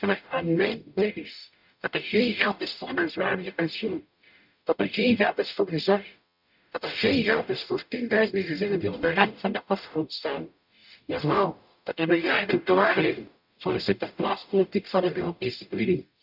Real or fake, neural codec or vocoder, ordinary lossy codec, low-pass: fake; codec, 44.1 kHz, 0.9 kbps, DAC; none; 5.4 kHz